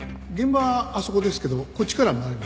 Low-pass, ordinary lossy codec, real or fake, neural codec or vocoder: none; none; real; none